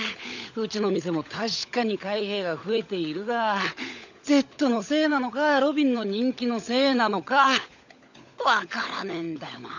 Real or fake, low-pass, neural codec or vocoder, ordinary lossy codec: fake; 7.2 kHz; codec, 16 kHz, 16 kbps, FunCodec, trained on LibriTTS, 50 frames a second; none